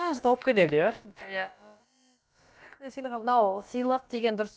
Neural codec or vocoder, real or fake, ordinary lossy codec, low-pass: codec, 16 kHz, about 1 kbps, DyCAST, with the encoder's durations; fake; none; none